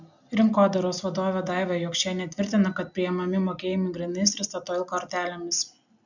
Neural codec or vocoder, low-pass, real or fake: none; 7.2 kHz; real